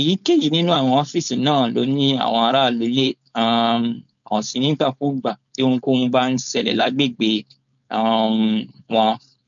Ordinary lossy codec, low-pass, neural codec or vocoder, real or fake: none; 7.2 kHz; codec, 16 kHz, 4.8 kbps, FACodec; fake